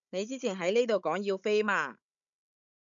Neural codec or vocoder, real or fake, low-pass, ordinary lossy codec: codec, 16 kHz, 16 kbps, FunCodec, trained on Chinese and English, 50 frames a second; fake; 7.2 kHz; MP3, 96 kbps